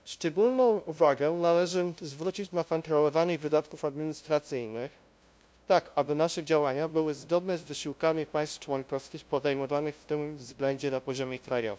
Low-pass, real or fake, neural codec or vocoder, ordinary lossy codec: none; fake; codec, 16 kHz, 0.5 kbps, FunCodec, trained on LibriTTS, 25 frames a second; none